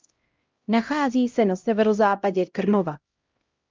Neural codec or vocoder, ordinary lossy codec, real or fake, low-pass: codec, 16 kHz, 0.5 kbps, X-Codec, HuBERT features, trained on LibriSpeech; Opus, 24 kbps; fake; 7.2 kHz